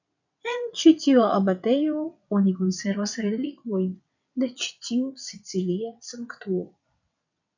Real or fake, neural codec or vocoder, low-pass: fake; vocoder, 22.05 kHz, 80 mel bands, WaveNeXt; 7.2 kHz